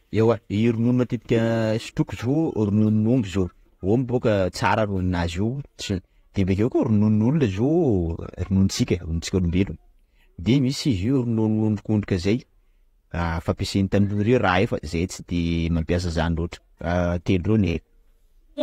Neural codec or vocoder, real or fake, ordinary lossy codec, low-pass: vocoder, 48 kHz, 128 mel bands, Vocos; fake; AAC, 48 kbps; 19.8 kHz